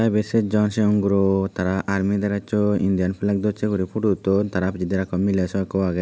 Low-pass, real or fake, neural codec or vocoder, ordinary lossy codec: none; real; none; none